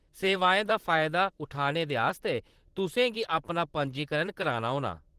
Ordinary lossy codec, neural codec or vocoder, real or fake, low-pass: Opus, 16 kbps; vocoder, 44.1 kHz, 128 mel bands, Pupu-Vocoder; fake; 14.4 kHz